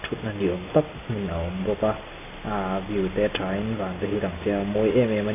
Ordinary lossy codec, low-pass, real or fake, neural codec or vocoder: AAC, 16 kbps; 3.6 kHz; real; none